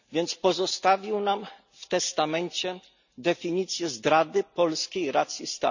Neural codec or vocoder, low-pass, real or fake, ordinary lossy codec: none; 7.2 kHz; real; none